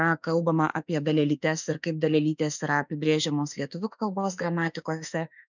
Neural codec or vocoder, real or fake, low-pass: autoencoder, 48 kHz, 32 numbers a frame, DAC-VAE, trained on Japanese speech; fake; 7.2 kHz